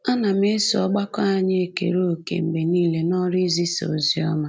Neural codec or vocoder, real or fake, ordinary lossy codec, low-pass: none; real; none; none